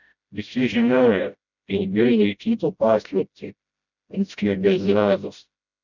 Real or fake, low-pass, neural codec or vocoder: fake; 7.2 kHz; codec, 16 kHz, 0.5 kbps, FreqCodec, smaller model